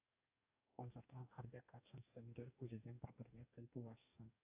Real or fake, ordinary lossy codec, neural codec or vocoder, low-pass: fake; AAC, 32 kbps; codec, 44.1 kHz, 2.6 kbps, DAC; 3.6 kHz